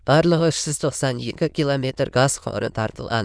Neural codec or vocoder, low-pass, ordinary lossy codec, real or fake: autoencoder, 22.05 kHz, a latent of 192 numbers a frame, VITS, trained on many speakers; 9.9 kHz; none; fake